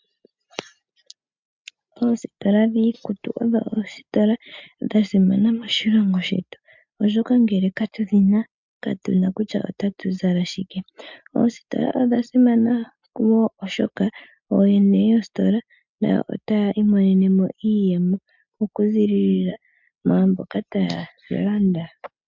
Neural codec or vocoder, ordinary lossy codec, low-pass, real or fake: none; MP3, 64 kbps; 7.2 kHz; real